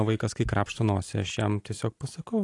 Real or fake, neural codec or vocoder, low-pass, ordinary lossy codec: real; none; 10.8 kHz; AAC, 48 kbps